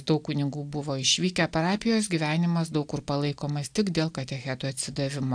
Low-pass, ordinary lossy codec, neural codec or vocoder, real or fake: 9.9 kHz; AAC, 64 kbps; none; real